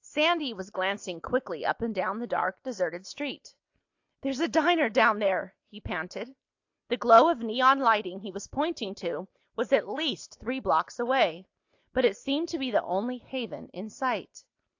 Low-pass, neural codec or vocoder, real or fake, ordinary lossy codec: 7.2 kHz; none; real; AAC, 48 kbps